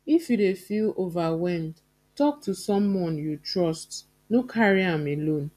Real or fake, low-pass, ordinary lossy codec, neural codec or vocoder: real; 14.4 kHz; none; none